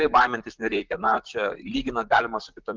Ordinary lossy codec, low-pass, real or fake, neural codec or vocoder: Opus, 24 kbps; 7.2 kHz; fake; vocoder, 44.1 kHz, 128 mel bands, Pupu-Vocoder